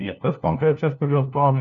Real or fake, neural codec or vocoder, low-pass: fake; codec, 16 kHz, 1 kbps, FunCodec, trained on LibriTTS, 50 frames a second; 7.2 kHz